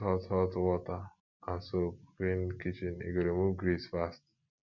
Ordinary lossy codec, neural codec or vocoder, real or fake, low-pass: none; none; real; 7.2 kHz